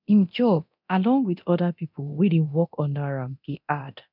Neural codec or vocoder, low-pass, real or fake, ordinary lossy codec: codec, 24 kHz, 0.9 kbps, DualCodec; 5.4 kHz; fake; none